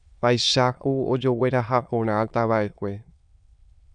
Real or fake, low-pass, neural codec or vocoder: fake; 9.9 kHz; autoencoder, 22.05 kHz, a latent of 192 numbers a frame, VITS, trained on many speakers